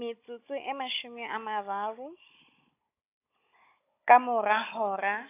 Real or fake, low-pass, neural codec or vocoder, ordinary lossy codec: fake; 3.6 kHz; codec, 16 kHz, 16 kbps, FunCodec, trained on Chinese and English, 50 frames a second; AAC, 24 kbps